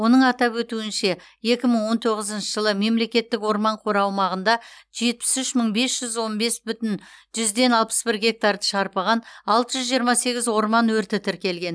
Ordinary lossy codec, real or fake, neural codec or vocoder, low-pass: none; real; none; none